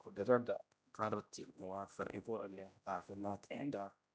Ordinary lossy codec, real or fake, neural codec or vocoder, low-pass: none; fake; codec, 16 kHz, 0.5 kbps, X-Codec, HuBERT features, trained on general audio; none